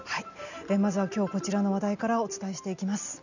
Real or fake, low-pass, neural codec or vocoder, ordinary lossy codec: real; 7.2 kHz; none; none